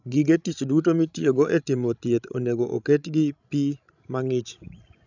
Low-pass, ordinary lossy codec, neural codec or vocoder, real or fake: 7.2 kHz; none; codec, 16 kHz, 16 kbps, FreqCodec, larger model; fake